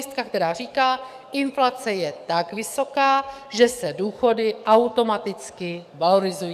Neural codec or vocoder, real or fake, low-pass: codec, 44.1 kHz, 7.8 kbps, DAC; fake; 14.4 kHz